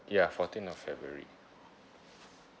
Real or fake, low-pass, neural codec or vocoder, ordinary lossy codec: real; none; none; none